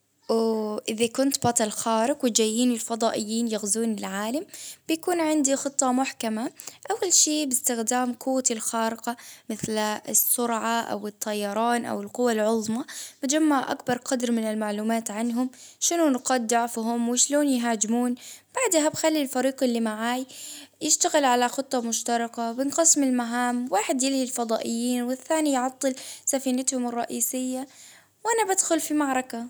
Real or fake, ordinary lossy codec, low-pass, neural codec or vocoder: real; none; none; none